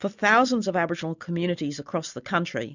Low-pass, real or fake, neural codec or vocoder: 7.2 kHz; real; none